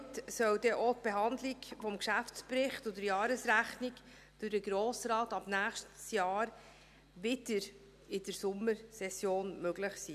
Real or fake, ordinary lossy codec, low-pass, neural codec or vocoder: real; none; 14.4 kHz; none